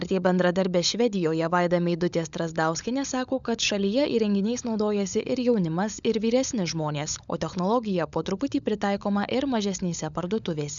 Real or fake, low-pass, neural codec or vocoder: fake; 7.2 kHz; codec, 16 kHz, 16 kbps, FunCodec, trained on LibriTTS, 50 frames a second